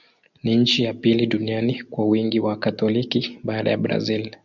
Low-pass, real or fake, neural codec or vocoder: 7.2 kHz; real; none